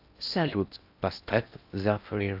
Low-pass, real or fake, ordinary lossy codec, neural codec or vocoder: 5.4 kHz; fake; AAC, 48 kbps; codec, 16 kHz in and 24 kHz out, 0.6 kbps, FocalCodec, streaming, 4096 codes